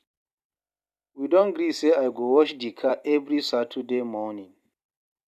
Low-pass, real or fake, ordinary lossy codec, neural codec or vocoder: 14.4 kHz; fake; none; vocoder, 44.1 kHz, 128 mel bands every 256 samples, BigVGAN v2